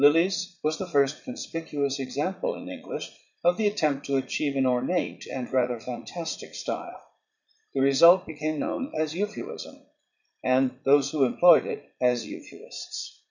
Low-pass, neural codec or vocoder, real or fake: 7.2 kHz; vocoder, 44.1 kHz, 80 mel bands, Vocos; fake